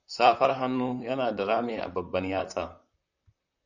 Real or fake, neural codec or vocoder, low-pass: fake; vocoder, 44.1 kHz, 128 mel bands, Pupu-Vocoder; 7.2 kHz